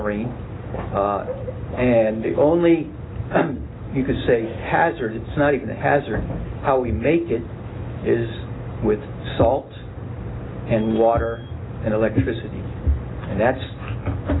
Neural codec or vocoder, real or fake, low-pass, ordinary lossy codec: none; real; 7.2 kHz; AAC, 16 kbps